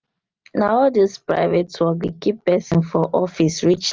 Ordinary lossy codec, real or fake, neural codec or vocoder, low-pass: Opus, 32 kbps; real; none; 7.2 kHz